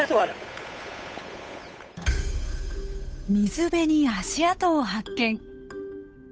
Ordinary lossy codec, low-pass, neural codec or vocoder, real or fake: none; none; codec, 16 kHz, 8 kbps, FunCodec, trained on Chinese and English, 25 frames a second; fake